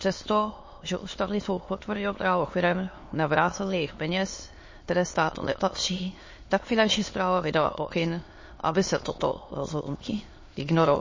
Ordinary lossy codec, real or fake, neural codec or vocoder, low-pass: MP3, 32 kbps; fake; autoencoder, 22.05 kHz, a latent of 192 numbers a frame, VITS, trained on many speakers; 7.2 kHz